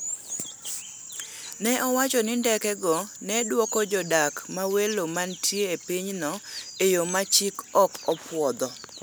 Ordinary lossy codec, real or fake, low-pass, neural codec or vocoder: none; real; none; none